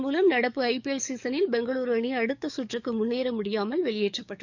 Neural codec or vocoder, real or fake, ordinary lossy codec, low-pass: codec, 44.1 kHz, 7.8 kbps, DAC; fake; none; 7.2 kHz